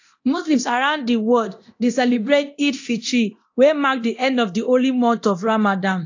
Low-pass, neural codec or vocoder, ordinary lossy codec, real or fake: 7.2 kHz; codec, 24 kHz, 0.9 kbps, DualCodec; AAC, 48 kbps; fake